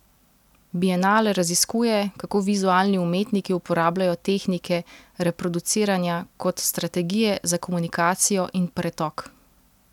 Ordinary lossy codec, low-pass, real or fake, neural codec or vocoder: none; 19.8 kHz; real; none